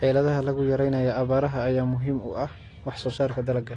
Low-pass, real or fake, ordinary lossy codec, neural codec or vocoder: 10.8 kHz; real; AAC, 32 kbps; none